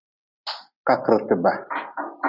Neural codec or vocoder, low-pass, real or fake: none; 5.4 kHz; real